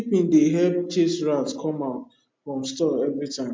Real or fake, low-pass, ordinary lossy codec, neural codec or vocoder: real; none; none; none